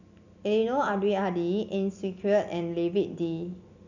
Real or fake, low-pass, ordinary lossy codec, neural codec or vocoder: real; 7.2 kHz; none; none